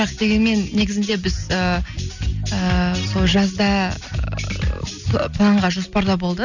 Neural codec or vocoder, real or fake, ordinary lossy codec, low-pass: none; real; none; 7.2 kHz